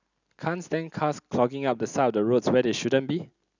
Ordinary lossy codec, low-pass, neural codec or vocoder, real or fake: none; 7.2 kHz; none; real